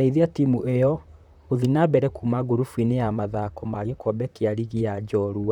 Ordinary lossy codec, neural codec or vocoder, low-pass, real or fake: none; vocoder, 44.1 kHz, 128 mel bands, Pupu-Vocoder; 19.8 kHz; fake